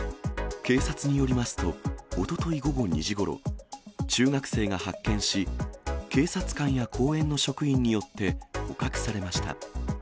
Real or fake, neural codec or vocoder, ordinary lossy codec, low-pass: real; none; none; none